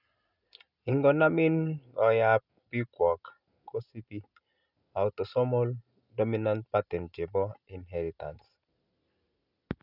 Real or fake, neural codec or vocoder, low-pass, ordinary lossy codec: real; none; 5.4 kHz; none